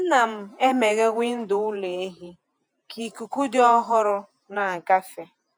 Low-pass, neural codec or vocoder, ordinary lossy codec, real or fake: none; vocoder, 48 kHz, 128 mel bands, Vocos; none; fake